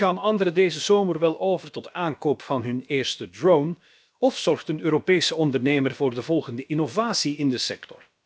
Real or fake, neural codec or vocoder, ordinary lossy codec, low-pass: fake; codec, 16 kHz, about 1 kbps, DyCAST, with the encoder's durations; none; none